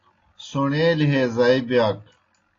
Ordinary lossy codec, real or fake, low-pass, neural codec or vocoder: AAC, 32 kbps; real; 7.2 kHz; none